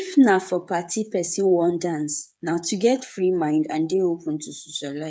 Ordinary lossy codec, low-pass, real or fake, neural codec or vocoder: none; none; fake; codec, 16 kHz, 8 kbps, FreqCodec, smaller model